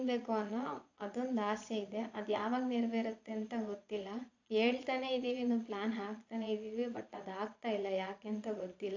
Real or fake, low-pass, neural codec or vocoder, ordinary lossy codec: fake; 7.2 kHz; vocoder, 22.05 kHz, 80 mel bands, Vocos; AAC, 48 kbps